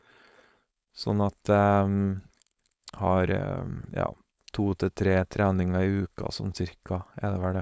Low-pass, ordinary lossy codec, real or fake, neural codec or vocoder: none; none; fake; codec, 16 kHz, 4.8 kbps, FACodec